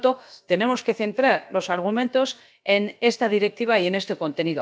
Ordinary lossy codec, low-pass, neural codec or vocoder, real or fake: none; none; codec, 16 kHz, about 1 kbps, DyCAST, with the encoder's durations; fake